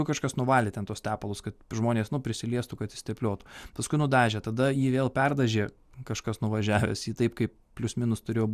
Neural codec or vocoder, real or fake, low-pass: none; real; 14.4 kHz